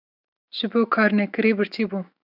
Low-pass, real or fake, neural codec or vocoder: 5.4 kHz; fake; autoencoder, 48 kHz, 128 numbers a frame, DAC-VAE, trained on Japanese speech